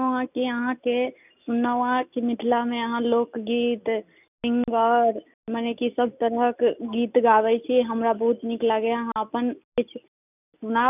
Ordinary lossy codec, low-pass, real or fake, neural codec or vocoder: none; 3.6 kHz; real; none